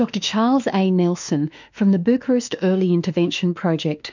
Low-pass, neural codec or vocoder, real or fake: 7.2 kHz; autoencoder, 48 kHz, 32 numbers a frame, DAC-VAE, trained on Japanese speech; fake